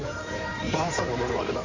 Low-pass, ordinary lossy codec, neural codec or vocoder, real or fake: 7.2 kHz; none; codec, 16 kHz in and 24 kHz out, 2.2 kbps, FireRedTTS-2 codec; fake